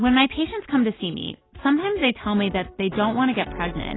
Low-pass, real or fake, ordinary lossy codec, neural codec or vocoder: 7.2 kHz; real; AAC, 16 kbps; none